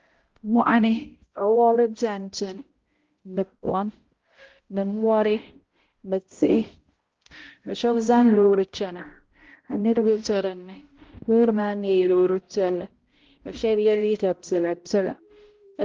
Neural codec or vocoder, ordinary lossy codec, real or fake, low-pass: codec, 16 kHz, 0.5 kbps, X-Codec, HuBERT features, trained on balanced general audio; Opus, 16 kbps; fake; 7.2 kHz